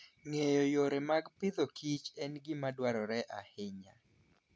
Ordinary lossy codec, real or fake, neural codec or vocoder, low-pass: none; real; none; none